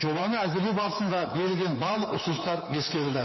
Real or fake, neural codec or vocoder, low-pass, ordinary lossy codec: fake; codec, 24 kHz, 3.1 kbps, DualCodec; 7.2 kHz; MP3, 24 kbps